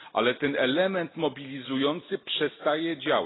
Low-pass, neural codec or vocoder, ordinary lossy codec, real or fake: 7.2 kHz; none; AAC, 16 kbps; real